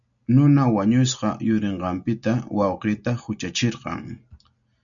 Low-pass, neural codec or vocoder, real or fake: 7.2 kHz; none; real